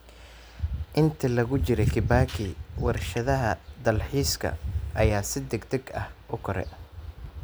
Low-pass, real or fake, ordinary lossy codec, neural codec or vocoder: none; real; none; none